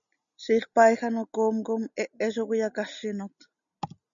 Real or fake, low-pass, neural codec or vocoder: real; 7.2 kHz; none